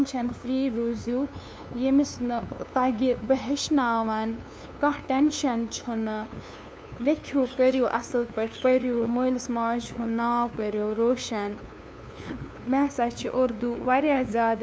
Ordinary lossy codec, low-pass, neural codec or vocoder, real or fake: none; none; codec, 16 kHz, 2 kbps, FunCodec, trained on LibriTTS, 25 frames a second; fake